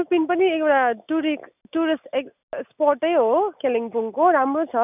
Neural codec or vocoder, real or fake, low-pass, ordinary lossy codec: none; real; 3.6 kHz; none